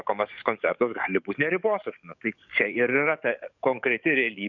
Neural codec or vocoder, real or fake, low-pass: codec, 44.1 kHz, 7.8 kbps, DAC; fake; 7.2 kHz